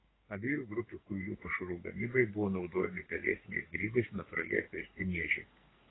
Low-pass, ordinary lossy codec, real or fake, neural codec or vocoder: 7.2 kHz; AAC, 16 kbps; fake; codec, 44.1 kHz, 2.6 kbps, SNAC